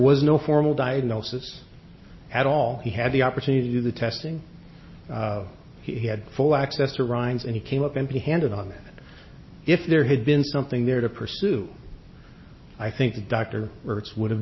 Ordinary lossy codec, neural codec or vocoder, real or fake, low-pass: MP3, 24 kbps; none; real; 7.2 kHz